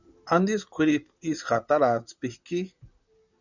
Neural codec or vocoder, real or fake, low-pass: vocoder, 44.1 kHz, 128 mel bands, Pupu-Vocoder; fake; 7.2 kHz